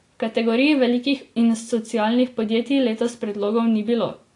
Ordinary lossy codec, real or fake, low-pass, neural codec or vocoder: AAC, 48 kbps; real; 10.8 kHz; none